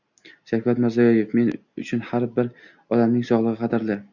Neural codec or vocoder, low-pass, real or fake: none; 7.2 kHz; real